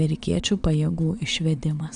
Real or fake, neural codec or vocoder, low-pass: fake; vocoder, 22.05 kHz, 80 mel bands, Vocos; 9.9 kHz